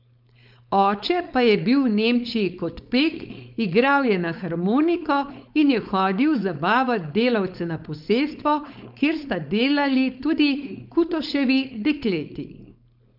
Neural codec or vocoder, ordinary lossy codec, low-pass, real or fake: codec, 16 kHz, 4.8 kbps, FACodec; none; 5.4 kHz; fake